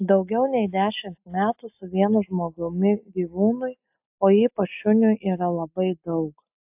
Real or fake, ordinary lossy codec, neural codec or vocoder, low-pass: real; AAC, 32 kbps; none; 3.6 kHz